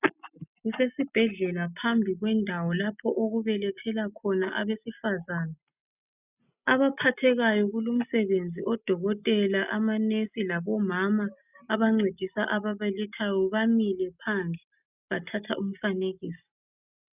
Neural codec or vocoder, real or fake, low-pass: none; real; 3.6 kHz